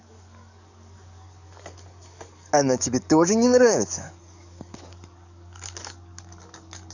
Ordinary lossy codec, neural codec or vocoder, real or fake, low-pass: none; codec, 44.1 kHz, 7.8 kbps, DAC; fake; 7.2 kHz